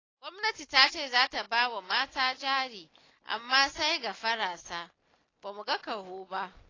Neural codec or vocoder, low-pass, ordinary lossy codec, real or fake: none; 7.2 kHz; AAC, 32 kbps; real